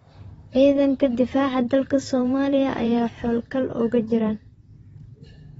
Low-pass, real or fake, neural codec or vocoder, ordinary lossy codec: 19.8 kHz; fake; vocoder, 44.1 kHz, 128 mel bands, Pupu-Vocoder; AAC, 24 kbps